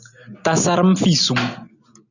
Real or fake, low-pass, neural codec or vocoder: real; 7.2 kHz; none